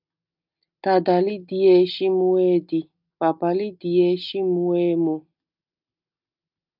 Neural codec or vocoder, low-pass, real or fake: none; 5.4 kHz; real